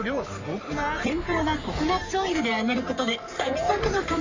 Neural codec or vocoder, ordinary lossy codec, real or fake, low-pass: codec, 44.1 kHz, 3.4 kbps, Pupu-Codec; MP3, 64 kbps; fake; 7.2 kHz